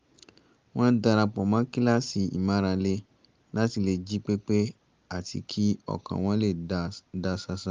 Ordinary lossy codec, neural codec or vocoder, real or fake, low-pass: Opus, 32 kbps; none; real; 7.2 kHz